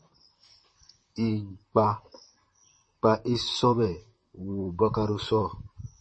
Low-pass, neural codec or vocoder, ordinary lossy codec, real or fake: 9.9 kHz; vocoder, 44.1 kHz, 128 mel bands, Pupu-Vocoder; MP3, 32 kbps; fake